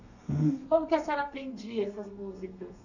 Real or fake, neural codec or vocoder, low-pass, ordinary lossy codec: fake; codec, 32 kHz, 1.9 kbps, SNAC; 7.2 kHz; none